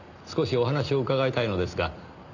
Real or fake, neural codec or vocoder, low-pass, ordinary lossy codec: real; none; 7.2 kHz; none